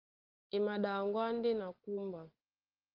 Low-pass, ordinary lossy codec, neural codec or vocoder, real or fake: 5.4 kHz; Opus, 32 kbps; none; real